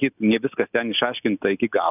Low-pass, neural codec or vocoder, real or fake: 3.6 kHz; none; real